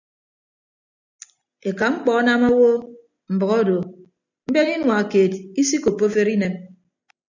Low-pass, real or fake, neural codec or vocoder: 7.2 kHz; real; none